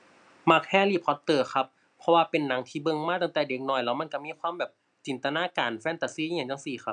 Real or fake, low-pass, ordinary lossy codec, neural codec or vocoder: real; 9.9 kHz; none; none